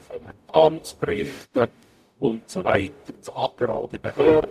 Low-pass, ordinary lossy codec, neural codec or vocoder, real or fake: 14.4 kHz; none; codec, 44.1 kHz, 0.9 kbps, DAC; fake